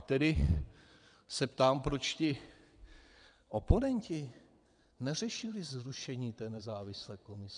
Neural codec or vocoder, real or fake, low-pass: vocoder, 22.05 kHz, 80 mel bands, WaveNeXt; fake; 9.9 kHz